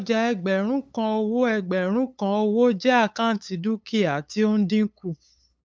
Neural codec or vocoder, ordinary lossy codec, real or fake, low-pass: codec, 16 kHz, 8 kbps, FunCodec, trained on LibriTTS, 25 frames a second; none; fake; none